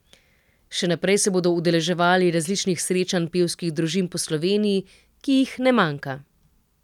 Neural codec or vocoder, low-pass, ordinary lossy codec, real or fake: none; 19.8 kHz; none; real